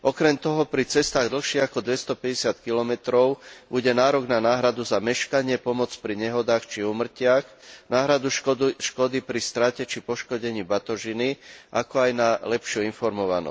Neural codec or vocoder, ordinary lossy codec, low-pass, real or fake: none; none; none; real